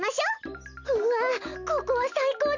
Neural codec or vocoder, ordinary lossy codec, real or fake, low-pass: none; none; real; 7.2 kHz